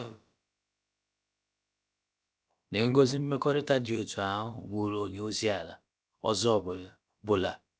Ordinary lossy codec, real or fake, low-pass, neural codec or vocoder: none; fake; none; codec, 16 kHz, about 1 kbps, DyCAST, with the encoder's durations